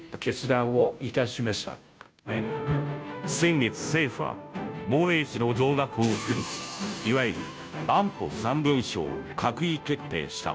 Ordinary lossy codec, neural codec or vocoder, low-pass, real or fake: none; codec, 16 kHz, 0.5 kbps, FunCodec, trained on Chinese and English, 25 frames a second; none; fake